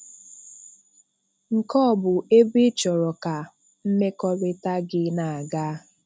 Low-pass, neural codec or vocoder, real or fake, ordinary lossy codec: none; none; real; none